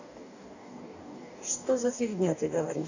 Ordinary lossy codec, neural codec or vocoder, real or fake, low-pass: none; codec, 44.1 kHz, 2.6 kbps, DAC; fake; 7.2 kHz